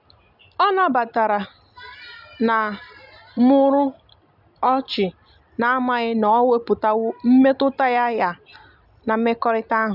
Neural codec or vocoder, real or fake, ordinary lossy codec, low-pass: none; real; none; 5.4 kHz